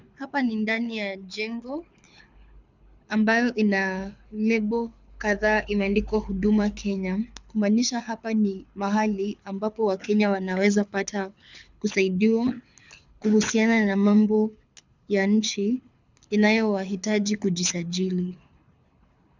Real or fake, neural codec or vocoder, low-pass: fake; codec, 24 kHz, 6 kbps, HILCodec; 7.2 kHz